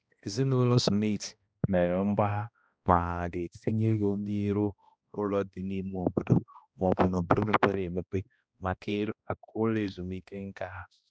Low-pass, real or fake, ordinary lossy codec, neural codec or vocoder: none; fake; none; codec, 16 kHz, 1 kbps, X-Codec, HuBERT features, trained on balanced general audio